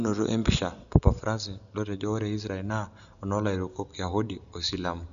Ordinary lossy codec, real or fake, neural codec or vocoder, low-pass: none; real; none; 7.2 kHz